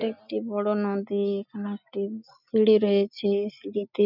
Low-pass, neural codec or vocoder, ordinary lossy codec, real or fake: 5.4 kHz; none; none; real